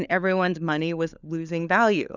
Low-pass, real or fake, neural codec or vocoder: 7.2 kHz; fake; codec, 16 kHz, 4 kbps, FunCodec, trained on LibriTTS, 50 frames a second